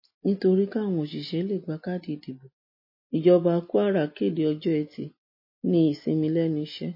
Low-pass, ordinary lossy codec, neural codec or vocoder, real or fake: 5.4 kHz; MP3, 24 kbps; none; real